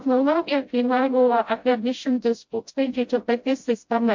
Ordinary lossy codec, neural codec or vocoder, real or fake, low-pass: MP3, 48 kbps; codec, 16 kHz, 0.5 kbps, FreqCodec, smaller model; fake; 7.2 kHz